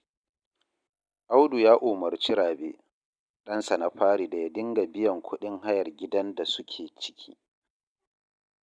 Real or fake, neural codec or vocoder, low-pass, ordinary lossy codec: real; none; 9.9 kHz; none